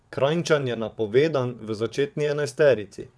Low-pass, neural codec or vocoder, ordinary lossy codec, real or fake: none; vocoder, 22.05 kHz, 80 mel bands, WaveNeXt; none; fake